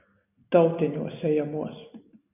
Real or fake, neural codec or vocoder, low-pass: real; none; 3.6 kHz